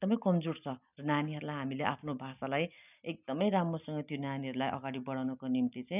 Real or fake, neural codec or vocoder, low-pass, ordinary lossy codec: real; none; 3.6 kHz; none